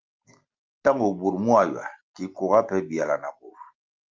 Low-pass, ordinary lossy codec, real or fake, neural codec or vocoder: 7.2 kHz; Opus, 32 kbps; real; none